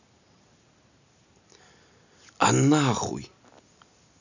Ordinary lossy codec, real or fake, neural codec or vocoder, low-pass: none; real; none; 7.2 kHz